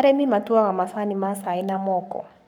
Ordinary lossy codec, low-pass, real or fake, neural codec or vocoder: none; 19.8 kHz; fake; codec, 44.1 kHz, 7.8 kbps, Pupu-Codec